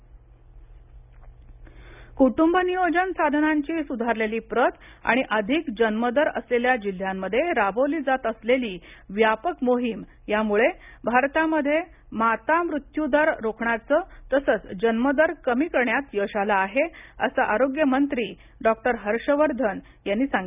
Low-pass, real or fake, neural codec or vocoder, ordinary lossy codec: 3.6 kHz; real; none; none